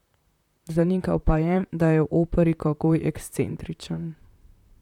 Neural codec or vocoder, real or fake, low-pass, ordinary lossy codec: vocoder, 44.1 kHz, 128 mel bands, Pupu-Vocoder; fake; 19.8 kHz; none